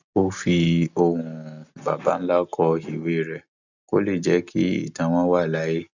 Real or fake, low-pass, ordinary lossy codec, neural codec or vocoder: real; 7.2 kHz; none; none